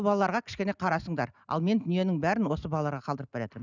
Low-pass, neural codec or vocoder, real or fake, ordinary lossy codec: 7.2 kHz; none; real; none